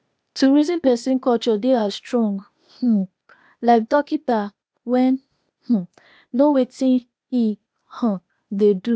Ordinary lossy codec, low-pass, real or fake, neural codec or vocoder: none; none; fake; codec, 16 kHz, 0.8 kbps, ZipCodec